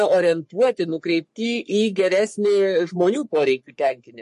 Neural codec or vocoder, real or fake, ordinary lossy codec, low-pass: codec, 44.1 kHz, 3.4 kbps, Pupu-Codec; fake; MP3, 48 kbps; 14.4 kHz